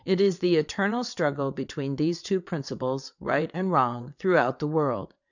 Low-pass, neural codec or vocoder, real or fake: 7.2 kHz; vocoder, 22.05 kHz, 80 mel bands, WaveNeXt; fake